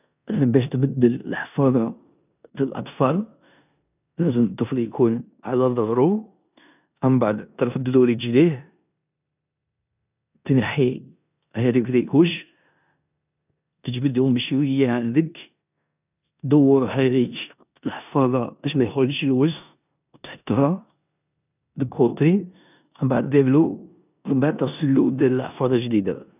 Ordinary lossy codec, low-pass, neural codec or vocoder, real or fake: none; 3.6 kHz; codec, 16 kHz in and 24 kHz out, 0.9 kbps, LongCat-Audio-Codec, four codebook decoder; fake